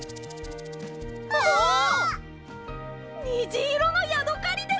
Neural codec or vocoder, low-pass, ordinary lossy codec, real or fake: none; none; none; real